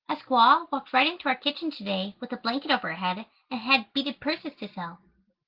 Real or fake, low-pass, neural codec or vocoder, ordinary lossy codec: real; 5.4 kHz; none; Opus, 16 kbps